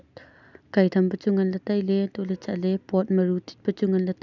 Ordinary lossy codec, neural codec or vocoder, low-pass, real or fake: none; none; 7.2 kHz; real